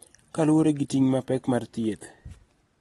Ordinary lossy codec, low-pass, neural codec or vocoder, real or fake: AAC, 32 kbps; 19.8 kHz; none; real